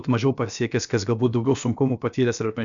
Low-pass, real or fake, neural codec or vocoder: 7.2 kHz; fake; codec, 16 kHz, about 1 kbps, DyCAST, with the encoder's durations